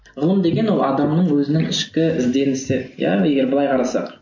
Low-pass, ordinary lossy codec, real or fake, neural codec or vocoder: 7.2 kHz; MP3, 48 kbps; real; none